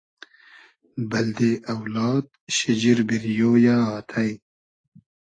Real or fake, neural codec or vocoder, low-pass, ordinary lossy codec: real; none; 9.9 kHz; AAC, 32 kbps